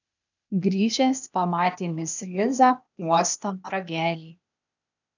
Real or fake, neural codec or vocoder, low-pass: fake; codec, 16 kHz, 0.8 kbps, ZipCodec; 7.2 kHz